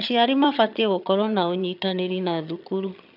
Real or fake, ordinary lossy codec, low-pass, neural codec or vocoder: fake; none; 5.4 kHz; vocoder, 22.05 kHz, 80 mel bands, HiFi-GAN